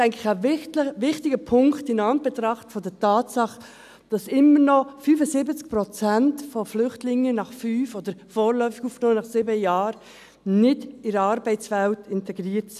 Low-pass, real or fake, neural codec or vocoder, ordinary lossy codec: 14.4 kHz; real; none; none